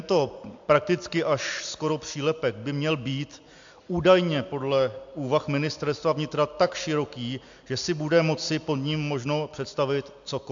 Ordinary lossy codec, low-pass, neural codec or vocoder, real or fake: AAC, 64 kbps; 7.2 kHz; none; real